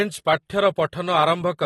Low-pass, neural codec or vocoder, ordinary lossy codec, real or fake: 19.8 kHz; none; AAC, 32 kbps; real